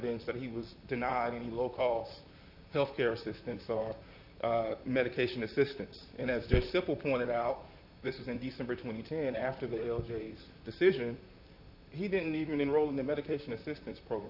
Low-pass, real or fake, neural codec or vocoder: 5.4 kHz; fake; vocoder, 44.1 kHz, 128 mel bands, Pupu-Vocoder